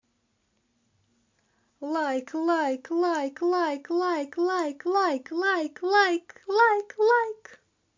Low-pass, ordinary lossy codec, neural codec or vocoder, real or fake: 7.2 kHz; MP3, 48 kbps; none; real